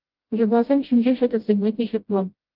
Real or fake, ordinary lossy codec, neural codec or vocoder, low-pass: fake; Opus, 24 kbps; codec, 16 kHz, 0.5 kbps, FreqCodec, smaller model; 5.4 kHz